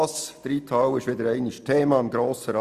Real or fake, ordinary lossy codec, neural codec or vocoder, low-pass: real; Opus, 64 kbps; none; 14.4 kHz